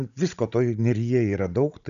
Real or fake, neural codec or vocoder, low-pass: fake; codec, 16 kHz, 16 kbps, FunCodec, trained on Chinese and English, 50 frames a second; 7.2 kHz